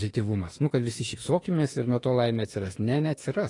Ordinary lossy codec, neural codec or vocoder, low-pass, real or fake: AAC, 32 kbps; autoencoder, 48 kHz, 32 numbers a frame, DAC-VAE, trained on Japanese speech; 10.8 kHz; fake